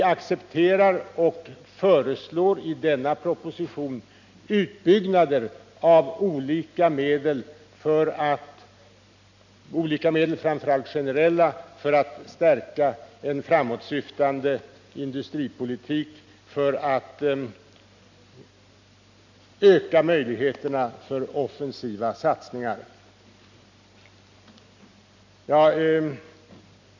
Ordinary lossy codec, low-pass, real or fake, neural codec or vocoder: none; 7.2 kHz; real; none